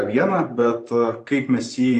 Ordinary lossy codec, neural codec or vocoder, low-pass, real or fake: AAC, 48 kbps; vocoder, 24 kHz, 100 mel bands, Vocos; 10.8 kHz; fake